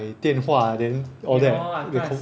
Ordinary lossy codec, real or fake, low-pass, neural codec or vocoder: none; real; none; none